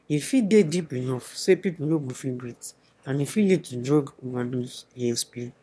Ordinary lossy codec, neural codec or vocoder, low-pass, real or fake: none; autoencoder, 22.05 kHz, a latent of 192 numbers a frame, VITS, trained on one speaker; none; fake